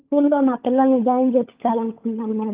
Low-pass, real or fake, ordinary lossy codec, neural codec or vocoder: 3.6 kHz; fake; Opus, 24 kbps; codec, 16 kHz, 16 kbps, FunCodec, trained on LibriTTS, 50 frames a second